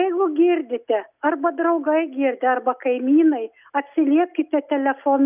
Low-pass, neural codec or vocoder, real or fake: 3.6 kHz; none; real